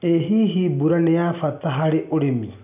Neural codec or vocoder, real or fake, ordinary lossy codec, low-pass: none; real; none; 3.6 kHz